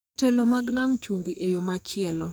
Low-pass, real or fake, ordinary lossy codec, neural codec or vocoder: none; fake; none; codec, 44.1 kHz, 2.6 kbps, SNAC